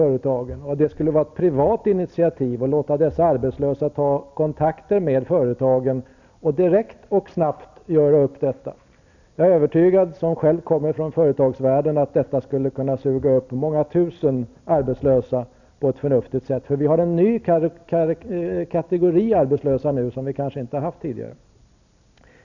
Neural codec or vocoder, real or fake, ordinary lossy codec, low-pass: none; real; none; 7.2 kHz